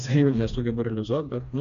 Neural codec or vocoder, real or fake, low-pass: codec, 16 kHz, 2 kbps, FreqCodec, smaller model; fake; 7.2 kHz